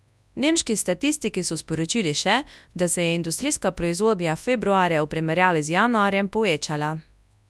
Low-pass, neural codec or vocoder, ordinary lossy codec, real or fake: none; codec, 24 kHz, 0.9 kbps, WavTokenizer, large speech release; none; fake